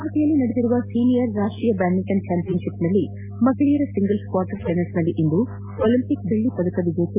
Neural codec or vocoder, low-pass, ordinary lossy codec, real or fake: none; 3.6 kHz; MP3, 24 kbps; real